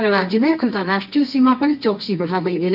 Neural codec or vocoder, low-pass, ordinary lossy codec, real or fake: codec, 24 kHz, 0.9 kbps, WavTokenizer, medium music audio release; 5.4 kHz; none; fake